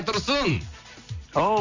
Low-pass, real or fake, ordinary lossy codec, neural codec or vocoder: 7.2 kHz; real; Opus, 64 kbps; none